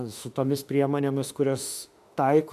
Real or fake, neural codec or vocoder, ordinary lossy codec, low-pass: fake; autoencoder, 48 kHz, 32 numbers a frame, DAC-VAE, trained on Japanese speech; MP3, 96 kbps; 14.4 kHz